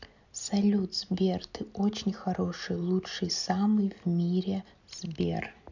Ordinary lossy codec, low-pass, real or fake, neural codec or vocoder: none; 7.2 kHz; real; none